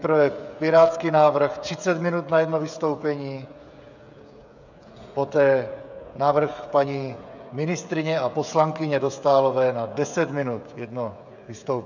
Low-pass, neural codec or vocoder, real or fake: 7.2 kHz; codec, 16 kHz, 16 kbps, FreqCodec, smaller model; fake